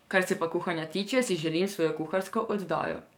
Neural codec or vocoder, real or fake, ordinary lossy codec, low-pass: codec, 44.1 kHz, 7.8 kbps, DAC; fake; none; 19.8 kHz